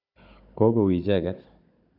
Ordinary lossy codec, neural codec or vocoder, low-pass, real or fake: AAC, 48 kbps; codec, 16 kHz, 16 kbps, FunCodec, trained on Chinese and English, 50 frames a second; 5.4 kHz; fake